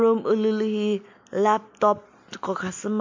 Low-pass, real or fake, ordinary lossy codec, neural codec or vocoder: 7.2 kHz; real; MP3, 48 kbps; none